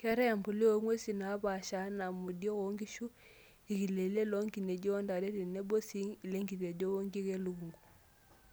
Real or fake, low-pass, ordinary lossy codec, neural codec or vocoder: real; none; none; none